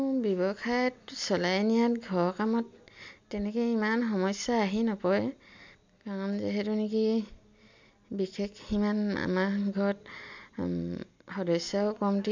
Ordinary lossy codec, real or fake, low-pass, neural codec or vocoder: MP3, 64 kbps; real; 7.2 kHz; none